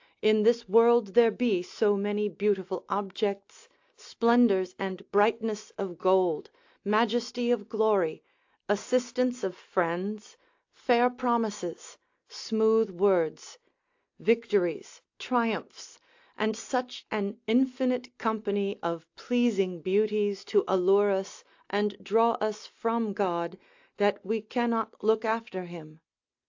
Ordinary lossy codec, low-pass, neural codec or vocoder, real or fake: AAC, 48 kbps; 7.2 kHz; none; real